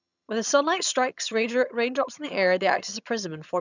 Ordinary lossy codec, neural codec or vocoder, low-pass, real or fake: none; vocoder, 22.05 kHz, 80 mel bands, HiFi-GAN; 7.2 kHz; fake